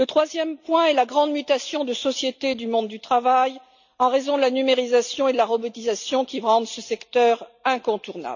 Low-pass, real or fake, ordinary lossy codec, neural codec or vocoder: 7.2 kHz; real; none; none